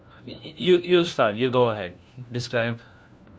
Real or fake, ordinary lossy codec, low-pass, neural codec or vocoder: fake; none; none; codec, 16 kHz, 1 kbps, FunCodec, trained on LibriTTS, 50 frames a second